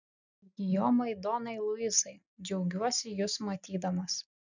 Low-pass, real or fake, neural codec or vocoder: 7.2 kHz; real; none